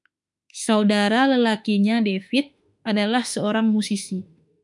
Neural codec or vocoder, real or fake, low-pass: autoencoder, 48 kHz, 32 numbers a frame, DAC-VAE, trained on Japanese speech; fake; 10.8 kHz